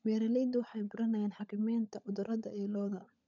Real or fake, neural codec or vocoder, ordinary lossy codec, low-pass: fake; codec, 16 kHz, 16 kbps, FunCodec, trained on LibriTTS, 50 frames a second; none; 7.2 kHz